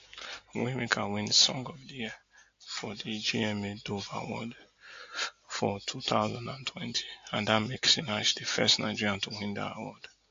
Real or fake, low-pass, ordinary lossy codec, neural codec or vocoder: real; 7.2 kHz; AAC, 48 kbps; none